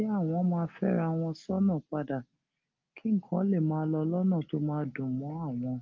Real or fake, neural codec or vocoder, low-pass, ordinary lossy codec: real; none; 7.2 kHz; Opus, 32 kbps